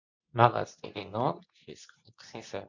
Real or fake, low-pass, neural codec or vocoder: fake; 7.2 kHz; codec, 24 kHz, 0.9 kbps, WavTokenizer, medium speech release version 2